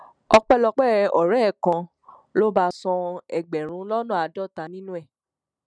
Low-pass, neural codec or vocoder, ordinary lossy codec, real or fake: 9.9 kHz; none; none; real